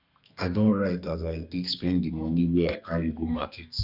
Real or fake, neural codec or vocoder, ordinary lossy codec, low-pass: fake; codec, 32 kHz, 1.9 kbps, SNAC; none; 5.4 kHz